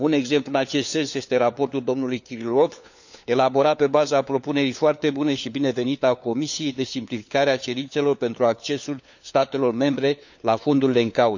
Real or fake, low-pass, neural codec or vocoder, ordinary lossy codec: fake; 7.2 kHz; codec, 16 kHz, 4 kbps, FunCodec, trained on LibriTTS, 50 frames a second; none